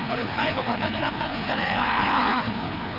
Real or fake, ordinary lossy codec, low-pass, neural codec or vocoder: fake; none; 5.4 kHz; codec, 16 kHz, 2 kbps, FreqCodec, larger model